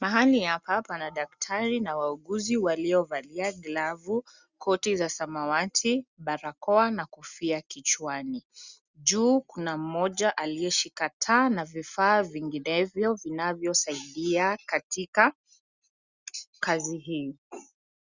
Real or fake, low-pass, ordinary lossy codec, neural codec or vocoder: real; 7.2 kHz; Opus, 64 kbps; none